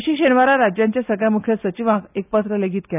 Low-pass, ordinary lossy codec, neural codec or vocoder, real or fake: 3.6 kHz; none; none; real